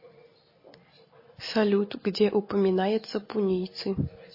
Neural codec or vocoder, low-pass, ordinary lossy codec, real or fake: none; 5.4 kHz; MP3, 24 kbps; real